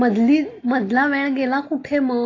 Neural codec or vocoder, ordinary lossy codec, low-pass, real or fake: none; AAC, 32 kbps; 7.2 kHz; real